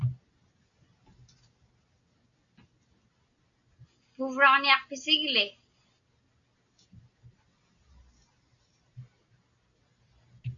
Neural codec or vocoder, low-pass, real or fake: none; 7.2 kHz; real